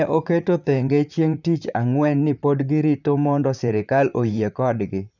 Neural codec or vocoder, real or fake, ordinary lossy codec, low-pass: vocoder, 44.1 kHz, 128 mel bands, Pupu-Vocoder; fake; none; 7.2 kHz